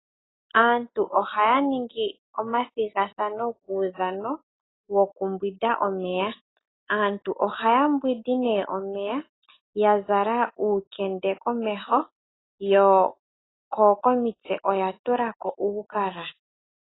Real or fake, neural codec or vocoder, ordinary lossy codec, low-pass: real; none; AAC, 16 kbps; 7.2 kHz